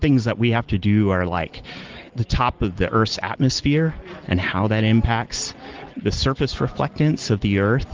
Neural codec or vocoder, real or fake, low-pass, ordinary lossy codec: none; real; 7.2 kHz; Opus, 16 kbps